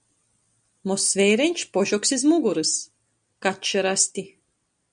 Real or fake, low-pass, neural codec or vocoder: real; 9.9 kHz; none